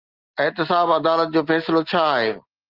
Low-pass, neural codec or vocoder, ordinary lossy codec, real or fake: 5.4 kHz; none; Opus, 32 kbps; real